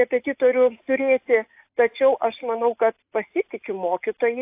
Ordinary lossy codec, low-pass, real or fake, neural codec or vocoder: AAC, 32 kbps; 3.6 kHz; real; none